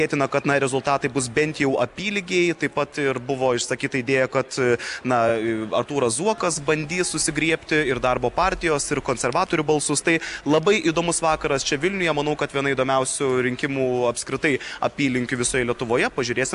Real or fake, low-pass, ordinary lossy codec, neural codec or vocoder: real; 10.8 kHz; AAC, 96 kbps; none